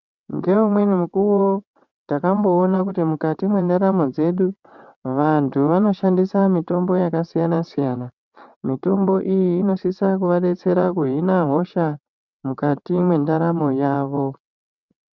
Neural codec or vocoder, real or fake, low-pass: vocoder, 22.05 kHz, 80 mel bands, WaveNeXt; fake; 7.2 kHz